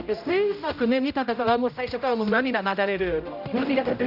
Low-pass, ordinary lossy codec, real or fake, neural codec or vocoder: 5.4 kHz; none; fake; codec, 16 kHz, 0.5 kbps, X-Codec, HuBERT features, trained on general audio